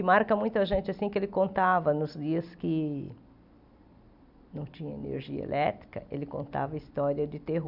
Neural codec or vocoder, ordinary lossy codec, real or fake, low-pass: none; none; real; 5.4 kHz